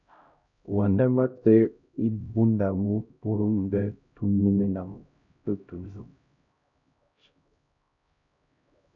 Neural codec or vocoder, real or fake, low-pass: codec, 16 kHz, 0.5 kbps, X-Codec, HuBERT features, trained on LibriSpeech; fake; 7.2 kHz